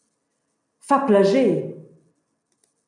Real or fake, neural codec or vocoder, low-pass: real; none; 10.8 kHz